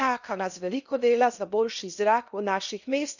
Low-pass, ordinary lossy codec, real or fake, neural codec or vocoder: 7.2 kHz; none; fake; codec, 16 kHz in and 24 kHz out, 0.8 kbps, FocalCodec, streaming, 65536 codes